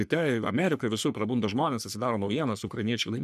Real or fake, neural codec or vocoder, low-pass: fake; codec, 44.1 kHz, 3.4 kbps, Pupu-Codec; 14.4 kHz